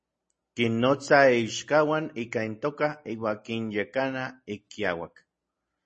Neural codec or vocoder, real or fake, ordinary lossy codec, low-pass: none; real; MP3, 32 kbps; 10.8 kHz